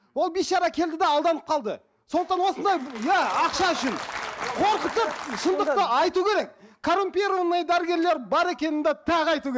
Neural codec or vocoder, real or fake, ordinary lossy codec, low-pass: none; real; none; none